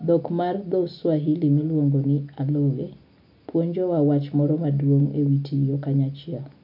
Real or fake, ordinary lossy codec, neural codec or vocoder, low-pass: real; MP3, 48 kbps; none; 5.4 kHz